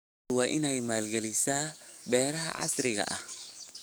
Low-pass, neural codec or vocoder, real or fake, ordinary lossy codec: none; codec, 44.1 kHz, 7.8 kbps, DAC; fake; none